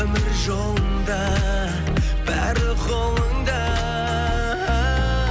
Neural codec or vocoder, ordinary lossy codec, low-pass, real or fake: none; none; none; real